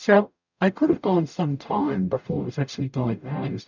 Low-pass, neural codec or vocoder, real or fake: 7.2 kHz; codec, 44.1 kHz, 0.9 kbps, DAC; fake